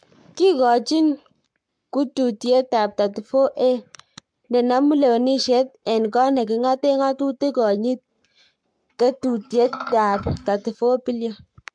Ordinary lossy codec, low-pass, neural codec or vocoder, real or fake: MP3, 64 kbps; 9.9 kHz; codec, 44.1 kHz, 7.8 kbps, Pupu-Codec; fake